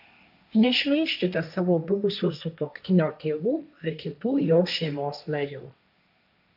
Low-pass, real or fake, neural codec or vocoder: 5.4 kHz; fake; codec, 16 kHz, 1.1 kbps, Voila-Tokenizer